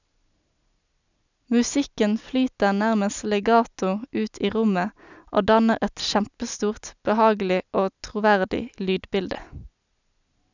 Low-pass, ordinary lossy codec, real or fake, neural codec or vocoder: 7.2 kHz; none; real; none